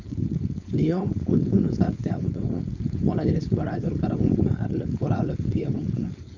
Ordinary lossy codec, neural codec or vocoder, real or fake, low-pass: none; codec, 16 kHz, 4.8 kbps, FACodec; fake; 7.2 kHz